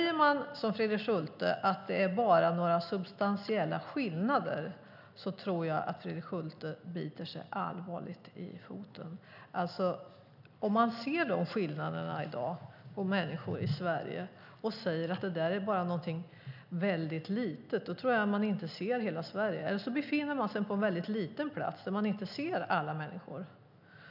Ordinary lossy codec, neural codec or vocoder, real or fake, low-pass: none; none; real; 5.4 kHz